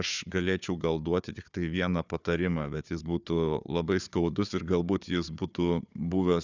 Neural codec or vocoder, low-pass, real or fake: codec, 16 kHz, 4 kbps, FunCodec, trained on Chinese and English, 50 frames a second; 7.2 kHz; fake